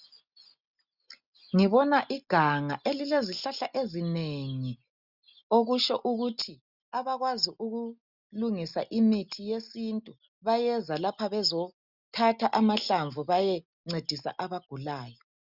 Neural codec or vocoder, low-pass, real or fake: none; 5.4 kHz; real